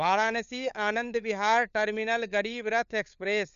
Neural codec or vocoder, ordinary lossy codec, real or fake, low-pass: codec, 16 kHz, 4 kbps, FunCodec, trained on LibriTTS, 50 frames a second; none; fake; 7.2 kHz